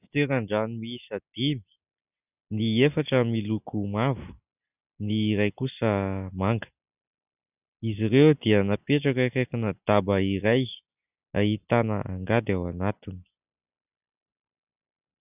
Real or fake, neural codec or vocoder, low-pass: real; none; 3.6 kHz